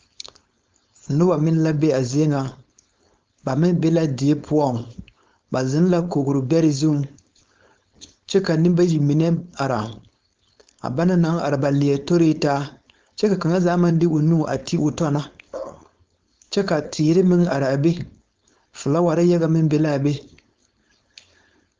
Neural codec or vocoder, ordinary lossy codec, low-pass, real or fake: codec, 16 kHz, 4.8 kbps, FACodec; Opus, 32 kbps; 7.2 kHz; fake